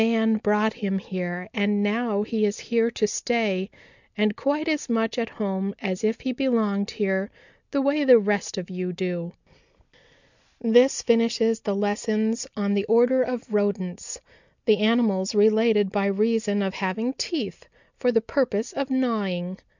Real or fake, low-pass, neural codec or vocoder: real; 7.2 kHz; none